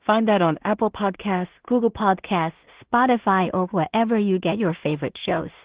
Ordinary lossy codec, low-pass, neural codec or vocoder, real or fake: Opus, 16 kbps; 3.6 kHz; codec, 16 kHz in and 24 kHz out, 0.4 kbps, LongCat-Audio-Codec, two codebook decoder; fake